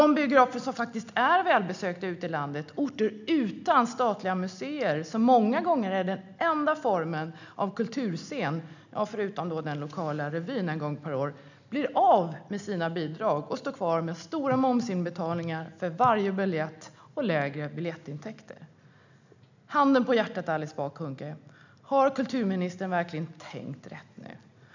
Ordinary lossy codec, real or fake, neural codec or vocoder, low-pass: none; real; none; 7.2 kHz